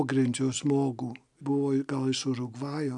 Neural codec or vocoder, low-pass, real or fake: none; 10.8 kHz; real